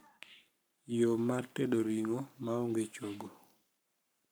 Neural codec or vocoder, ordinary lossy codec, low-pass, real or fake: codec, 44.1 kHz, 7.8 kbps, Pupu-Codec; none; none; fake